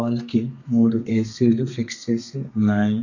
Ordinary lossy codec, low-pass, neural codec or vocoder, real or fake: none; 7.2 kHz; codec, 32 kHz, 1.9 kbps, SNAC; fake